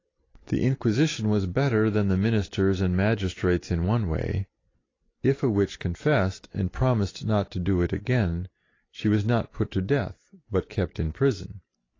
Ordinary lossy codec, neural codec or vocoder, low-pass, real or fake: AAC, 32 kbps; none; 7.2 kHz; real